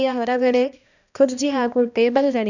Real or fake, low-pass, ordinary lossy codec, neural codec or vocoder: fake; 7.2 kHz; none; codec, 16 kHz, 1 kbps, X-Codec, HuBERT features, trained on balanced general audio